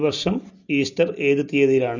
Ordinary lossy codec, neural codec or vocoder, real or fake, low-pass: none; none; real; 7.2 kHz